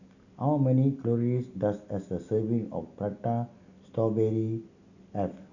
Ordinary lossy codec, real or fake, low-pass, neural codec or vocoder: none; real; 7.2 kHz; none